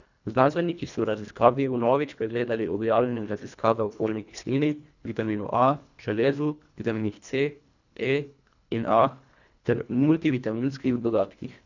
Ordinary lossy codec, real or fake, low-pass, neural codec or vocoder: none; fake; 7.2 kHz; codec, 24 kHz, 1.5 kbps, HILCodec